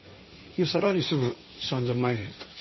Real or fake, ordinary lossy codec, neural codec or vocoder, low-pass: fake; MP3, 24 kbps; codec, 16 kHz, 1.1 kbps, Voila-Tokenizer; 7.2 kHz